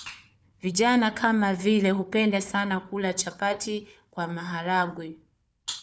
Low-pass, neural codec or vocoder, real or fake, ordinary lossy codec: none; codec, 16 kHz, 4 kbps, FunCodec, trained on Chinese and English, 50 frames a second; fake; none